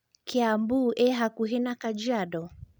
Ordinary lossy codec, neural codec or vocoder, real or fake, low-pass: none; none; real; none